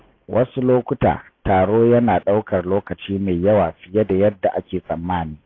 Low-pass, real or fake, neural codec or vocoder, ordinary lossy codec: 7.2 kHz; real; none; AAC, 32 kbps